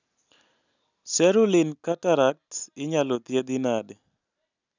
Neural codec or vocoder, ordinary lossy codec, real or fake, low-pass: none; none; real; 7.2 kHz